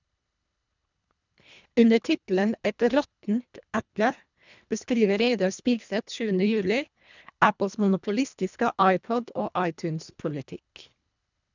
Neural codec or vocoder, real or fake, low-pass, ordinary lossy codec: codec, 24 kHz, 1.5 kbps, HILCodec; fake; 7.2 kHz; none